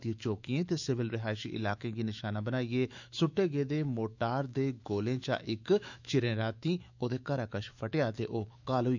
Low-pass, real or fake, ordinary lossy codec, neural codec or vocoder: 7.2 kHz; fake; none; autoencoder, 48 kHz, 128 numbers a frame, DAC-VAE, trained on Japanese speech